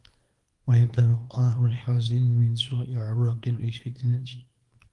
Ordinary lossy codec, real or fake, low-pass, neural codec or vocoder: Opus, 24 kbps; fake; 10.8 kHz; codec, 24 kHz, 0.9 kbps, WavTokenizer, small release